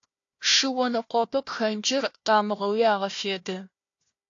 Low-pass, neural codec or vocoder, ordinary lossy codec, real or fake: 7.2 kHz; codec, 16 kHz, 1 kbps, FunCodec, trained on Chinese and English, 50 frames a second; AAC, 48 kbps; fake